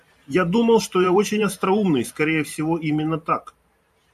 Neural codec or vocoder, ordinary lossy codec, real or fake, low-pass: vocoder, 44.1 kHz, 128 mel bands every 512 samples, BigVGAN v2; MP3, 96 kbps; fake; 14.4 kHz